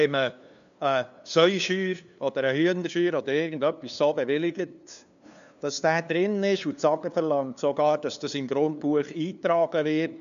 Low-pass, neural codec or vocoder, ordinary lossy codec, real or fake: 7.2 kHz; codec, 16 kHz, 2 kbps, FunCodec, trained on LibriTTS, 25 frames a second; AAC, 96 kbps; fake